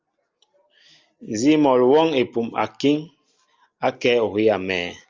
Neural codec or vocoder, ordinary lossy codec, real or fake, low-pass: none; Opus, 32 kbps; real; 7.2 kHz